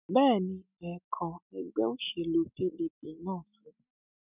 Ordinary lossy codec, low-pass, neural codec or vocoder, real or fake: none; 3.6 kHz; none; real